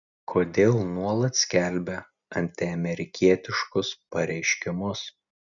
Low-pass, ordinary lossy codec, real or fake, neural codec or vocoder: 7.2 kHz; MP3, 96 kbps; real; none